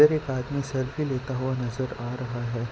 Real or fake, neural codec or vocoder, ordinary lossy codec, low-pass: real; none; none; none